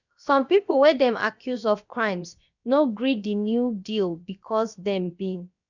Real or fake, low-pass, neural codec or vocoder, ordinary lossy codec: fake; 7.2 kHz; codec, 16 kHz, about 1 kbps, DyCAST, with the encoder's durations; none